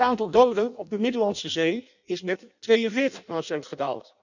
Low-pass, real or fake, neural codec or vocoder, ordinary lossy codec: 7.2 kHz; fake; codec, 16 kHz in and 24 kHz out, 0.6 kbps, FireRedTTS-2 codec; none